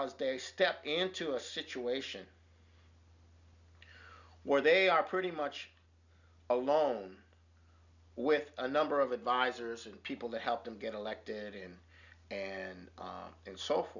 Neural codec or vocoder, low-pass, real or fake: none; 7.2 kHz; real